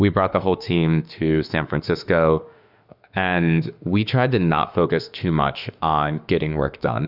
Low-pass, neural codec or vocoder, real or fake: 5.4 kHz; autoencoder, 48 kHz, 32 numbers a frame, DAC-VAE, trained on Japanese speech; fake